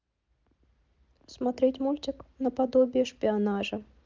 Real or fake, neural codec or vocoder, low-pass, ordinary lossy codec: real; none; 7.2 kHz; Opus, 32 kbps